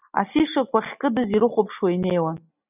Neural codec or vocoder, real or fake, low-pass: none; real; 3.6 kHz